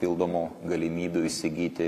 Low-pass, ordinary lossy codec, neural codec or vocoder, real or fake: 14.4 kHz; AAC, 64 kbps; none; real